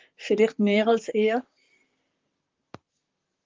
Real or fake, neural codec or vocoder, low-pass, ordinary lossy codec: fake; vocoder, 44.1 kHz, 128 mel bands, Pupu-Vocoder; 7.2 kHz; Opus, 32 kbps